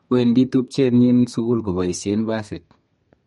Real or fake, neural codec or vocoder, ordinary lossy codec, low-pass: fake; codec, 32 kHz, 1.9 kbps, SNAC; MP3, 48 kbps; 14.4 kHz